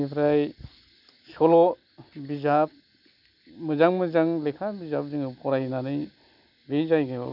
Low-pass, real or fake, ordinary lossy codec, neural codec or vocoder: 5.4 kHz; real; none; none